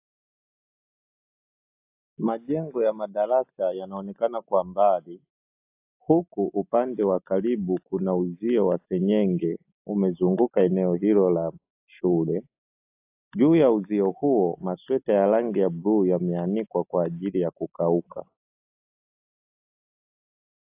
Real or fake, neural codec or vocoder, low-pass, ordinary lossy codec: real; none; 3.6 kHz; AAC, 32 kbps